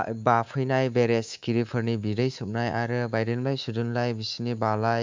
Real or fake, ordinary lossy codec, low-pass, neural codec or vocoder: fake; MP3, 64 kbps; 7.2 kHz; codec, 24 kHz, 3.1 kbps, DualCodec